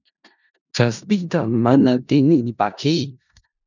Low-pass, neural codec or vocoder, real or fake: 7.2 kHz; codec, 16 kHz in and 24 kHz out, 0.4 kbps, LongCat-Audio-Codec, four codebook decoder; fake